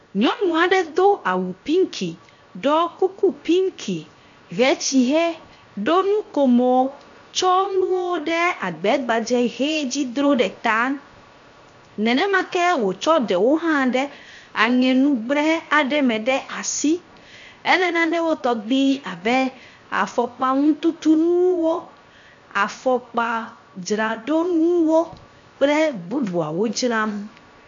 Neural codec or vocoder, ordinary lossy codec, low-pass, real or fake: codec, 16 kHz, 0.7 kbps, FocalCodec; AAC, 64 kbps; 7.2 kHz; fake